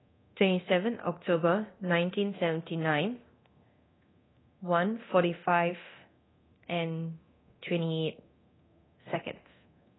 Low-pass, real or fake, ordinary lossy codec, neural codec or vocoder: 7.2 kHz; fake; AAC, 16 kbps; codec, 24 kHz, 0.9 kbps, DualCodec